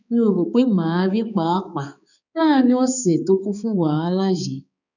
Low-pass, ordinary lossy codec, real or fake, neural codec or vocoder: 7.2 kHz; none; fake; codec, 16 kHz, 4 kbps, X-Codec, HuBERT features, trained on balanced general audio